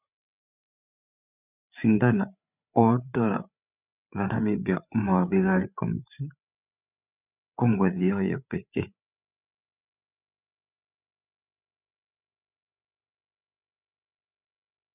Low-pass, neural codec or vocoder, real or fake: 3.6 kHz; codec, 16 kHz, 8 kbps, FreqCodec, larger model; fake